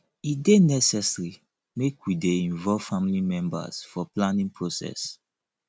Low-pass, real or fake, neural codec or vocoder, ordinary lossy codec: none; real; none; none